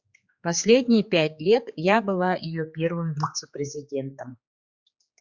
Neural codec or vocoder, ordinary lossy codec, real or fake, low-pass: codec, 16 kHz, 4 kbps, X-Codec, HuBERT features, trained on general audio; Opus, 64 kbps; fake; 7.2 kHz